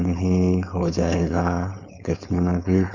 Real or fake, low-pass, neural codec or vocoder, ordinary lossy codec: fake; 7.2 kHz; codec, 16 kHz, 4.8 kbps, FACodec; none